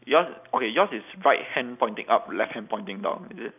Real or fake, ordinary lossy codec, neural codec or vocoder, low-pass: real; none; none; 3.6 kHz